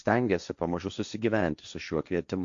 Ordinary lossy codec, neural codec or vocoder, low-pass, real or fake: Opus, 64 kbps; codec, 16 kHz, 1.1 kbps, Voila-Tokenizer; 7.2 kHz; fake